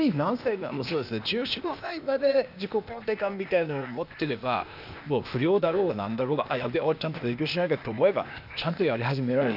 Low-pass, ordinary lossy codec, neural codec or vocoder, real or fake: 5.4 kHz; none; codec, 16 kHz, 0.8 kbps, ZipCodec; fake